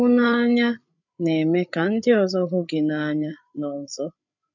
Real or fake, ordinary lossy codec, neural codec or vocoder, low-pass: fake; none; codec, 16 kHz, 8 kbps, FreqCodec, larger model; 7.2 kHz